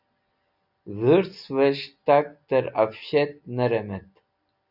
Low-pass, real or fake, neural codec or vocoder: 5.4 kHz; real; none